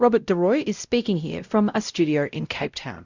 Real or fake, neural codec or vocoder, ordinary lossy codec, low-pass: fake; codec, 16 kHz, 0.5 kbps, X-Codec, WavLM features, trained on Multilingual LibriSpeech; Opus, 64 kbps; 7.2 kHz